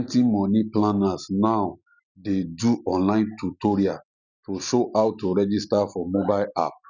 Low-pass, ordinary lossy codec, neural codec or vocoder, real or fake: 7.2 kHz; none; none; real